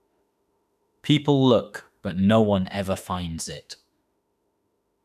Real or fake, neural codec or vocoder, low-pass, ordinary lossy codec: fake; autoencoder, 48 kHz, 32 numbers a frame, DAC-VAE, trained on Japanese speech; 14.4 kHz; none